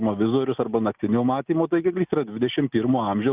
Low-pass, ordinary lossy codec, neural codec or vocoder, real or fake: 3.6 kHz; Opus, 24 kbps; none; real